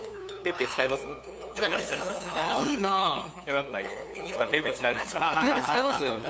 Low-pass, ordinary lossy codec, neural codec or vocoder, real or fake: none; none; codec, 16 kHz, 2 kbps, FunCodec, trained on LibriTTS, 25 frames a second; fake